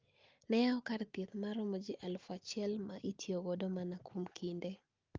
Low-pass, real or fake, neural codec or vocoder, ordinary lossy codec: 7.2 kHz; real; none; Opus, 32 kbps